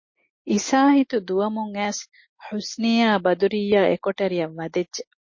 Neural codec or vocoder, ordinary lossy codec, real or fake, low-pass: none; MP3, 32 kbps; real; 7.2 kHz